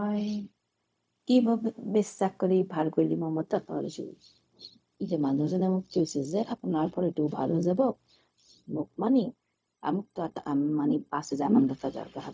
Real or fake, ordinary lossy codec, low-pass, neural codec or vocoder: fake; none; none; codec, 16 kHz, 0.4 kbps, LongCat-Audio-Codec